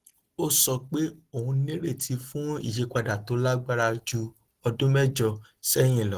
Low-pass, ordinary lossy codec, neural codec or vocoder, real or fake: 14.4 kHz; Opus, 16 kbps; none; real